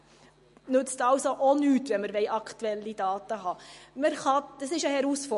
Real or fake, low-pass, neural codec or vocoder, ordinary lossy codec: real; 14.4 kHz; none; MP3, 48 kbps